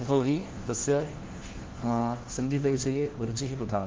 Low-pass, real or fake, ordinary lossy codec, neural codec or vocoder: 7.2 kHz; fake; Opus, 16 kbps; codec, 16 kHz, 1 kbps, FunCodec, trained on LibriTTS, 50 frames a second